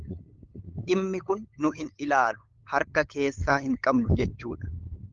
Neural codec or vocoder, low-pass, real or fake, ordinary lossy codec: codec, 16 kHz, 8 kbps, FunCodec, trained on LibriTTS, 25 frames a second; 7.2 kHz; fake; Opus, 32 kbps